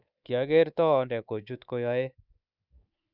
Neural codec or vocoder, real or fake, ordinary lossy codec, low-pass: codec, 24 kHz, 3.1 kbps, DualCodec; fake; none; 5.4 kHz